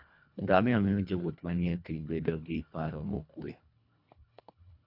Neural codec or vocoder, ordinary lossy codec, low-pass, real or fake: codec, 24 kHz, 1.5 kbps, HILCodec; none; 5.4 kHz; fake